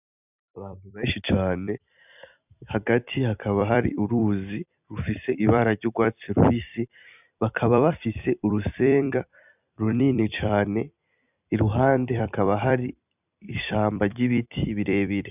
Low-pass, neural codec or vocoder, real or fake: 3.6 kHz; vocoder, 44.1 kHz, 128 mel bands every 256 samples, BigVGAN v2; fake